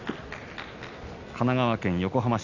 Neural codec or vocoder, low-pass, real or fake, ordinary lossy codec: none; 7.2 kHz; real; none